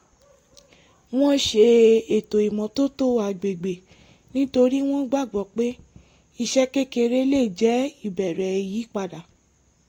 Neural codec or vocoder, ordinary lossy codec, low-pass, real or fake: none; AAC, 48 kbps; 19.8 kHz; real